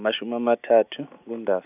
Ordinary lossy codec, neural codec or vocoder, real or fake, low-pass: none; none; real; 3.6 kHz